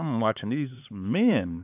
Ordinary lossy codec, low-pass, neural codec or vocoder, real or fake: AAC, 32 kbps; 3.6 kHz; codec, 16 kHz, 4 kbps, X-Codec, HuBERT features, trained on LibriSpeech; fake